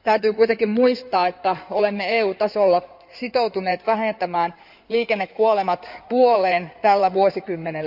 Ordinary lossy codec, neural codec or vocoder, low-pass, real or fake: none; codec, 16 kHz in and 24 kHz out, 2.2 kbps, FireRedTTS-2 codec; 5.4 kHz; fake